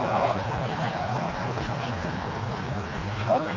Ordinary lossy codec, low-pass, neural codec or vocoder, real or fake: AAC, 32 kbps; 7.2 kHz; codec, 16 kHz, 2 kbps, FreqCodec, smaller model; fake